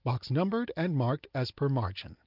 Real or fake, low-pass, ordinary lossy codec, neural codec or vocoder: real; 5.4 kHz; Opus, 24 kbps; none